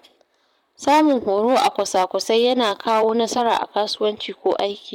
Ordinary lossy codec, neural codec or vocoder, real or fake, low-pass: MP3, 96 kbps; vocoder, 44.1 kHz, 128 mel bands, Pupu-Vocoder; fake; 19.8 kHz